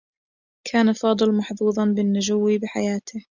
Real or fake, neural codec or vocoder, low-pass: real; none; 7.2 kHz